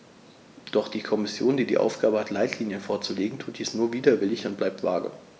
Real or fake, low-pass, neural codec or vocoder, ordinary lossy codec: real; none; none; none